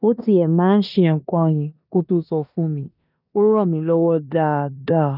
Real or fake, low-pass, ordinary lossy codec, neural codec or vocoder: fake; 5.4 kHz; none; codec, 16 kHz in and 24 kHz out, 0.9 kbps, LongCat-Audio-Codec, four codebook decoder